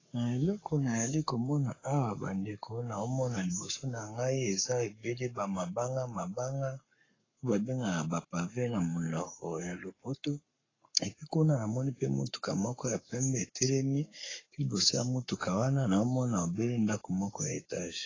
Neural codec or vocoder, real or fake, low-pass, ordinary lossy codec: codec, 44.1 kHz, 7.8 kbps, Pupu-Codec; fake; 7.2 kHz; AAC, 32 kbps